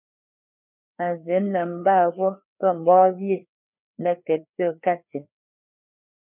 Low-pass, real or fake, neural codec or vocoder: 3.6 kHz; fake; codec, 16 kHz, 2 kbps, FreqCodec, larger model